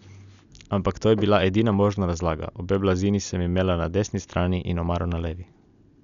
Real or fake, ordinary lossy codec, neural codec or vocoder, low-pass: real; none; none; 7.2 kHz